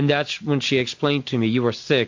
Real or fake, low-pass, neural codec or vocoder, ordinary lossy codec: real; 7.2 kHz; none; MP3, 48 kbps